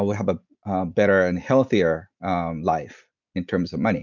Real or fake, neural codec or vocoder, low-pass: real; none; 7.2 kHz